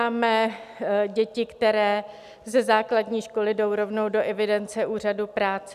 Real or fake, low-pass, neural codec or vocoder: real; 14.4 kHz; none